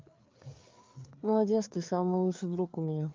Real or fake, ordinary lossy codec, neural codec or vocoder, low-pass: fake; Opus, 24 kbps; codec, 16 kHz, 4 kbps, FreqCodec, larger model; 7.2 kHz